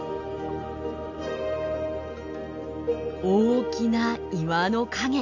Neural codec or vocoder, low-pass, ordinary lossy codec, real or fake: none; 7.2 kHz; MP3, 64 kbps; real